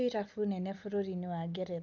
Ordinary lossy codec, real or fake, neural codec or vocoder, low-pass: Opus, 24 kbps; real; none; 7.2 kHz